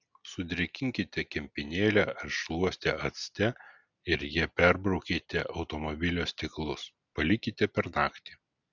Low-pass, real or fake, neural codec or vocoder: 7.2 kHz; real; none